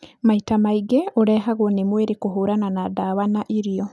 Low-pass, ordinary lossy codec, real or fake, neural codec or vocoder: none; none; real; none